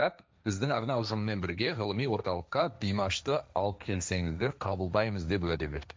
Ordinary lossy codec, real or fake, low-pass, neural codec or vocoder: none; fake; none; codec, 16 kHz, 1.1 kbps, Voila-Tokenizer